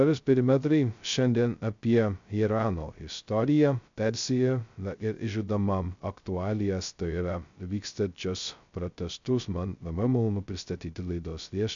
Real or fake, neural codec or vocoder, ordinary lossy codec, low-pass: fake; codec, 16 kHz, 0.2 kbps, FocalCodec; MP3, 64 kbps; 7.2 kHz